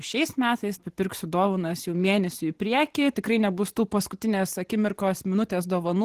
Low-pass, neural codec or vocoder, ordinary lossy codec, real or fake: 14.4 kHz; vocoder, 44.1 kHz, 128 mel bands every 512 samples, BigVGAN v2; Opus, 16 kbps; fake